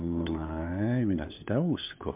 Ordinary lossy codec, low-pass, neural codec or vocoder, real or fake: MP3, 32 kbps; 3.6 kHz; codec, 16 kHz, 4 kbps, FunCodec, trained on LibriTTS, 50 frames a second; fake